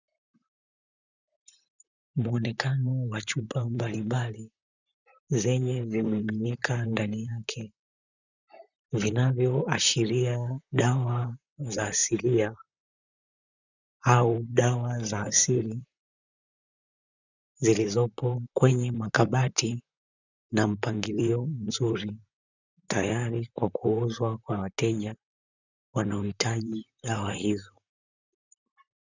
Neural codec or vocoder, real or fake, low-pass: vocoder, 44.1 kHz, 128 mel bands, Pupu-Vocoder; fake; 7.2 kHz